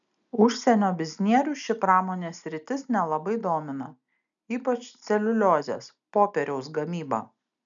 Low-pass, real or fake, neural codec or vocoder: 7.2 kHz; real; none